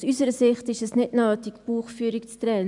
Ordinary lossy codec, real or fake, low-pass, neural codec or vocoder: none; real; 10.8 kHz; none